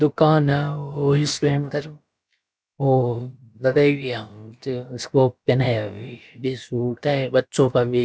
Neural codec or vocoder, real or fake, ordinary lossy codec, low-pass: codec, 16 kHz, about 1 kbps, DyCAST, with the encoder's durations; fake; none; none